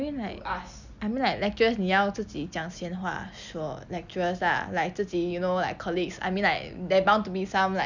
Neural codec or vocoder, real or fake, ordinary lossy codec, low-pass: none; real; none; 7.2 kHz